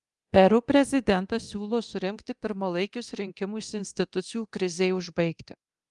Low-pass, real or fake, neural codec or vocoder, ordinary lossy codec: 10.8 kHz; fake; codec, 24 kHz, 0.9 kbps, DualCodec; Opus, 32 kbps